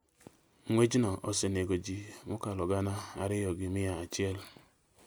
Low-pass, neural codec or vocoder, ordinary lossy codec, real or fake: none; none; none; real